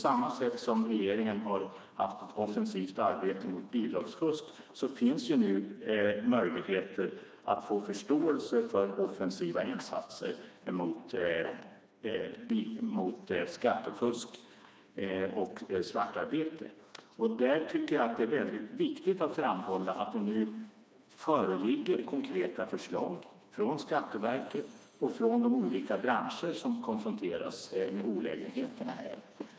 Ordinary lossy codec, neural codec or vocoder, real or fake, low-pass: none; codec, 16 kHz, 2 kbps, FreqCodec, smaller model; fake; none